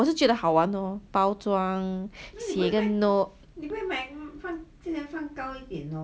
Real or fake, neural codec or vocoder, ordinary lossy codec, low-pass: real; none; none; none